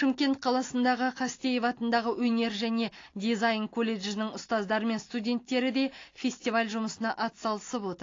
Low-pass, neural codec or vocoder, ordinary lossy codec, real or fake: 7.2 kHz; none; AAC, 32 kbps; real